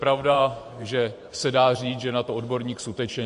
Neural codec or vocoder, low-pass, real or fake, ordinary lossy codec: vocoder, 44.1 kHz, 128 mel bands every 512 samples, BigVGAN v2; 14.4 kHz; fake; MP3, 48 kbps